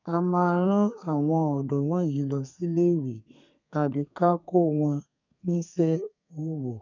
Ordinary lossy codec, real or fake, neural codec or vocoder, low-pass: none; fake; codec, 32 kHz, 1.9 kbps, SNAC; 7.2 kHz